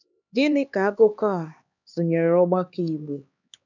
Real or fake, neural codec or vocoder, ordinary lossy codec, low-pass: fake; codec, 16 kHz, 2 kbps, X-Codec, HuBERT features, trained on LibriSpeech; none; 7.2 kHz